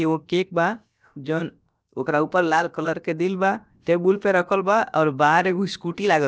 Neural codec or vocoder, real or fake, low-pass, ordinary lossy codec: codec, 16 kHz, about 1 kbps, DyCAST, with the encoder's durations; fake; none; none